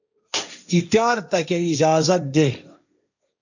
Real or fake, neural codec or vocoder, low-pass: fake; codec, 16 kHz, 1.1 kbps, Voila-Tokenizer; 7.2 kHz